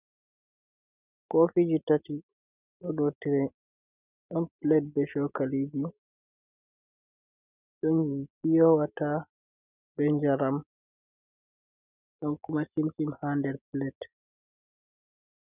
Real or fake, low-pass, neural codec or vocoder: real; 3.6 kHz; none